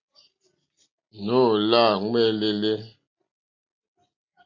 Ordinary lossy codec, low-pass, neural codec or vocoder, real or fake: MP3, 48 kbps; 7.2 kHz; none; real